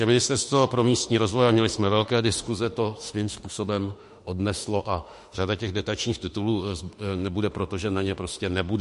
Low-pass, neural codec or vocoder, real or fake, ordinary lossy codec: 14.4 kHz; autoencoder, 48 kHz, 32 numbers a frame, DAC-VAE, trained on Japanese speech; fake; MP3, 48 kbps